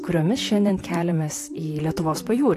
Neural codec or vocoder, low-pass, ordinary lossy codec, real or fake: vocoder, 44.1 kHz, 128 mel bands, Pupu-Vocoder; 14.4 kHz; AAC, 64 kbps; fake